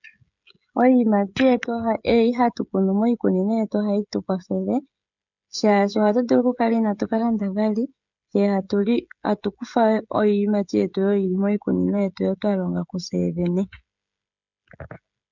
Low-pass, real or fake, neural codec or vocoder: 7.2 kHz; fake; codec, 16 kHz, 16 kbps, FreqCodec, smaller model